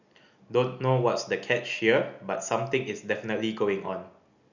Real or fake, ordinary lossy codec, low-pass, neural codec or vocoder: real; none; 7.2 kHz; none